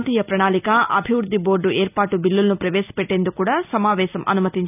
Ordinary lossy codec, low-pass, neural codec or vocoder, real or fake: none; 3.6 kHz; none; real